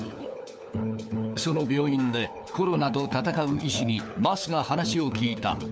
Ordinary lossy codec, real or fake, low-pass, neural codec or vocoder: none; fake; none; codec, 16 kHz, 4 kbps, FunCodec, trained on LibriTTS, 50 frames a second